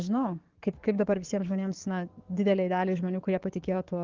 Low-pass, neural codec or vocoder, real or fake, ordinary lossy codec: 7.2 kHz; codec, 44.1 kHz, 7.8 kbps, DAC; fake; Opus, 16 kbps